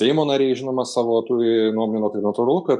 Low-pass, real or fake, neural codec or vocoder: 10.8 kHz; real; none